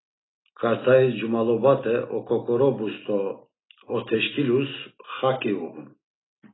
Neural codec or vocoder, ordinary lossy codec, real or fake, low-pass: none; AAC, 16 kbps; real; 7.2 kHz